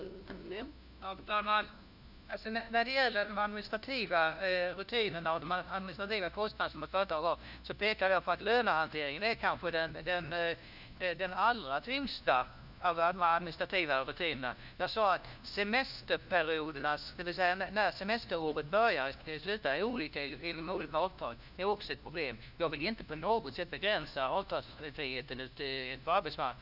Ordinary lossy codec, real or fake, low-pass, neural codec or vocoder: none; fake; 5.4 kHz; codec, 16 kHz, 1 kbps, FunCodec, trained on LibriTTS, 50 frames a second